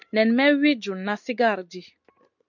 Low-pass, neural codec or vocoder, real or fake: 7.2 kHz; none; real